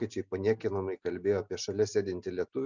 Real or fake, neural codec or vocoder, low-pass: real; none; 7.2 kHz